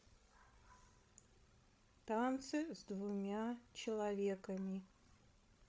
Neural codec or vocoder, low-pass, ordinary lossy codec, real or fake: codec, 16 kHz, 16 kbps, FreqCodec, larger model; none; none; fake